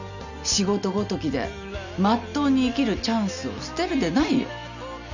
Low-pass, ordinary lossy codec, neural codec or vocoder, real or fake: 7.2 kHz; none; none; real